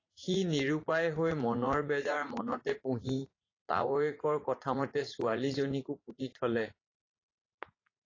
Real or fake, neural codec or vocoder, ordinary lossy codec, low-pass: fake; vocoder, 44.1 kHz, 80 mel bands, Vocos; AAC, 32 kbps; 7.2 kHz